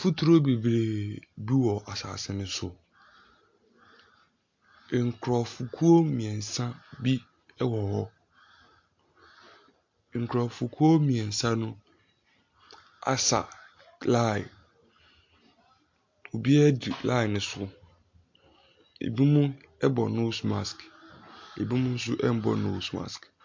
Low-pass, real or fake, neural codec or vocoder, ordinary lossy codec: 7.2 kHz; real; none; MP3, 48 kbps